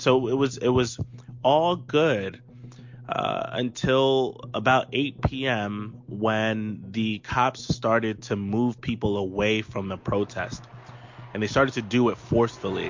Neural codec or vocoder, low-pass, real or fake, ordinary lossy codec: none; 7.2 kHz; real; MP3, 48 kbps